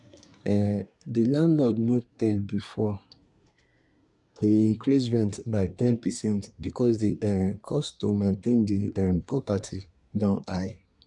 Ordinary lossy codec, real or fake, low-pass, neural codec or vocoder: none; fake; 10.8 kHz; codec, 24 kHz, 1 kbps, SNAC